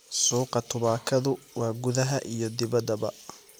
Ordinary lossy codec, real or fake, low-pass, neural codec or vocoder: none; fake; none; vocoder, 44.1 kHz, 128 mel bands every 256 samples, BigVGAN v2